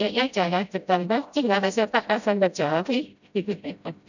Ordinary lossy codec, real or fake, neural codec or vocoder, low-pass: none; fake; codec, 16 kHz, 0.5 kbps, FreqCodec, smaller model; 7.2 kHz